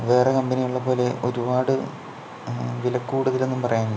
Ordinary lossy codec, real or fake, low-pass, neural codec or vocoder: none; real; none; none